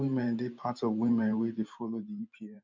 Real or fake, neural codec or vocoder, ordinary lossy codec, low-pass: fake; vocoder, 44.1 kHz, 128 mel bands every 512 samples, BigVGAN v2; MP3, 64 kbps; 7.2 kHz